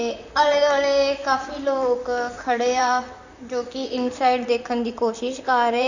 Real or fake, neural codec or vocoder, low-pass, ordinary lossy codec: fake; vocoder, 44.1 kHz, 128 mel bands, Pupu-Vocoder; 7.2 kHz; none